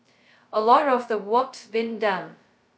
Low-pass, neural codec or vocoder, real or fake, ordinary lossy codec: none; codec, 16 kHz, 0.2 kbps, FocalCodec; fake; none